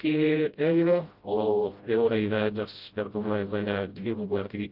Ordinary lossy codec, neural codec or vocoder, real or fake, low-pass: Opus, 32 kbps; codec, 16 kHz, 0.5 kbps, FreqCodec, smaller model; fake; 5.4 kHz